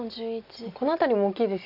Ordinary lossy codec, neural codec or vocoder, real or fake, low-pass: none; none; real; 5.4 kHz